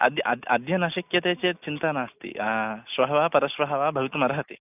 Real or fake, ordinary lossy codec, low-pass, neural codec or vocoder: real; none; 3.6 kHz; none